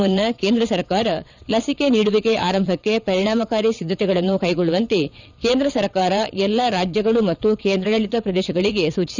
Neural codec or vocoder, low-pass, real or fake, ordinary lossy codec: vocoder, 22.05 kHz, 80 mel bands, WaveNeXt; 7.2 kHz; fake; none